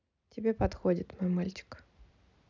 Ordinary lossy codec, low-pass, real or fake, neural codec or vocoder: none; 7.2 kHz; real; none